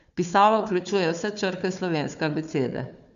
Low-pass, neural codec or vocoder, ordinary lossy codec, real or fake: 7.2 kHz; codec, 16 kHz, 4 kbps, FunCodec, trained on Chinese and English, 50 frames a second; none; fake